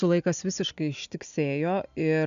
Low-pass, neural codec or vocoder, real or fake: 7.2 kHz; none; real